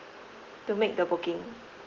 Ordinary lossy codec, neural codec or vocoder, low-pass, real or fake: Opus, 16 kbps; none; 7.2 kHz; real